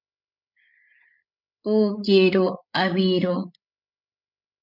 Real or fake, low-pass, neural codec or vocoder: fake; 5.4 kHz; codec, 16 kHz, 16 kbps, FreqCodec, larger model